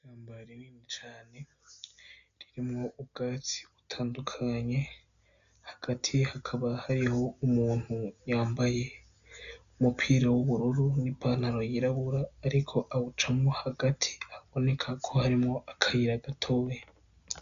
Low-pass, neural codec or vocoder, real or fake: 7.2 kHz; none; real